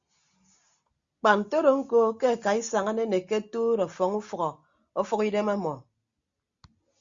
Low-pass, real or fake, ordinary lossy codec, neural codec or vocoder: 7.2 kHz; real; Opus, 64 kbps; none